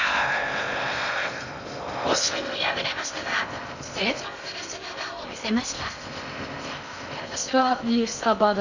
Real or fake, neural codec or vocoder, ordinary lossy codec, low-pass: fake; codec, 16 kHz in and 24 kHz out, 0.6 kbps, FocalCodec, streaming, 2048 codes; none; 7.2 kHz